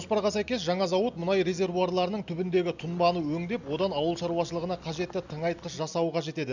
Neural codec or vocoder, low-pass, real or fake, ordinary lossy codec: none; 7.2 kHz; real; none